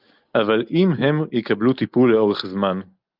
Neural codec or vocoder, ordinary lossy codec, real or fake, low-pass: none; Opus, 24 kbps; real; 5.4 kHz